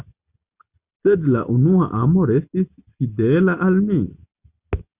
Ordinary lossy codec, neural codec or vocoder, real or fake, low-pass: Opus, 64 kbps; none; real; 3.6 kHz